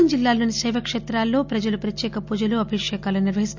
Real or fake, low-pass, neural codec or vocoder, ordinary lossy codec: real; 7.2 kHz; none; none